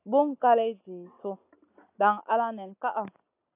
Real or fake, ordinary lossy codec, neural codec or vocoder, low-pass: real; AAC, 32 kbps; none; 3.6 kHz